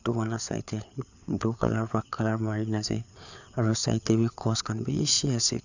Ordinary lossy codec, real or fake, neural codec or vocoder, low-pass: none; fake; codec, 16 kHz, 4 kbps, FreqCodec, larger model; 7.2 kHz